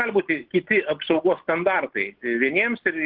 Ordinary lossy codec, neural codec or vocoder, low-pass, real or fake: Opus, 16 kbps; none; 5.4 kHz; real